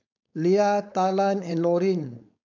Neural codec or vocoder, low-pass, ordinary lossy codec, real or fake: codec, 16 kHz, 4.8 kbps, FACodec; 7.2 kHz; none; fake